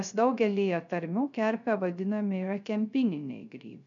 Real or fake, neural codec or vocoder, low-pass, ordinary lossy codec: fake; codec, 16 kHz, 0.3 kbps, FocalCodec; 7.2 kHz; MP3, 96 kbps